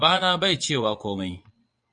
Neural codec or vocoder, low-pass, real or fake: vocoder, 22.05 kHz, 80 mel bands, Vocos; 9.9 kHz; fake